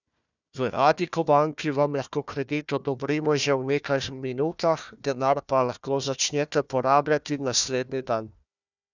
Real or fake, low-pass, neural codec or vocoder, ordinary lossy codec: fake; 7.2 kHz; codec, 16 kHz, 1 kbps, FunCodec, trained on Chinese and English, 50 frames a second; none